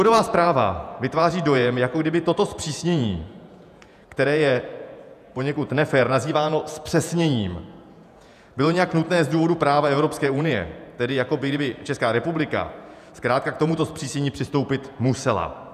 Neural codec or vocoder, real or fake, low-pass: vocoder, 48 kHz, 128 mel bands, Vocos; fake; 14.4 kHz